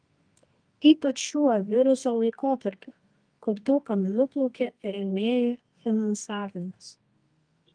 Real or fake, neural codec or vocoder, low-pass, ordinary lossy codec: fake; codec, 24 kHz, 0.9 kbps, WavTokenizer, medium music audio release; 9.9 kHz; Opus, 32 kbps